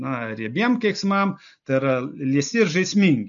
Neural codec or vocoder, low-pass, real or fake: none; 7.2 kHz; real